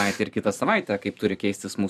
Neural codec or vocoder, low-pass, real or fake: vocoder, 44.1 kHz, 128 mel bands every 256 samples, BigVGAN v2; 14.4 kHz; fake